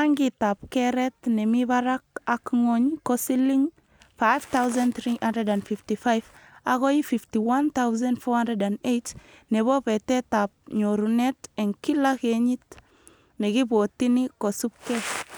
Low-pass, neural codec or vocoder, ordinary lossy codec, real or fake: none; none; none; real